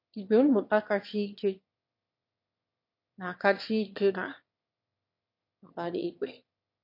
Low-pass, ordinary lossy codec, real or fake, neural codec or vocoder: 5.4 kHz; MP3, 32 kbps; fake; autoencoder, 22.05 kHz, a latent of 192 numbers a frame, VITS, trained on one speaker